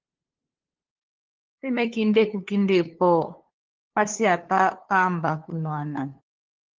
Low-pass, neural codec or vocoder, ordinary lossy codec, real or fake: 7.2 kHz; codec, 16 kHz, 2 kbps, FunCodec, trained on LibriTTS, 25 frames a second; Opus, 16 kbps; fake